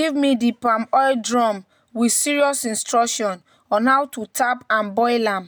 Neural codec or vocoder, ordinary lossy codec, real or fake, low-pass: none; none; real; none